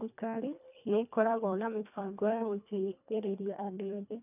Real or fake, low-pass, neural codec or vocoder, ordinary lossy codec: fake; 3.6 kHz; codec, 24 kHz, 1.5 kbps, HILCodec; none